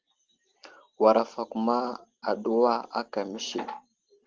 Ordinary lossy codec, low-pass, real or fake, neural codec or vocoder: Opus, 16 kbps; 7.2 kHz; real; none